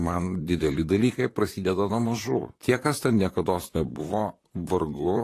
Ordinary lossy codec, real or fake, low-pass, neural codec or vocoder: AAC, 48 kbps; fake; 14.4 kHz; vocoder, 44.1 kHz, 128 mel bands, Pupu-Vocoder